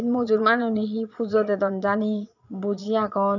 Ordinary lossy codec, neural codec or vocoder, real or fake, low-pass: none; vocoder, 22.05 kHz, 80 mel bands, Vocos; fake; 7.2 kHz